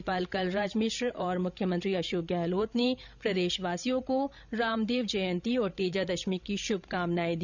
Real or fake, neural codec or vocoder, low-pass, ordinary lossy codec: fake; codec, 16 kHz, 16 kbps, FreqCodec, larger model; 7.2 kHz; none